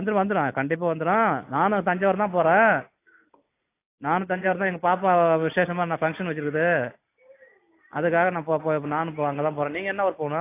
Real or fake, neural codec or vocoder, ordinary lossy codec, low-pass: real; none; AAC, 24 kbps; 3.6 kHz